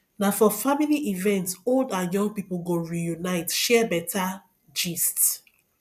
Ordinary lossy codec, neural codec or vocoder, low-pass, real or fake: none; none; 14.4 kHz; real